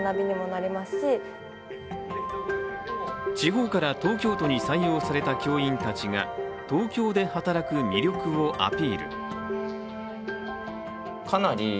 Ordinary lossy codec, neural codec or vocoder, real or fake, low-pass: none; none; real; none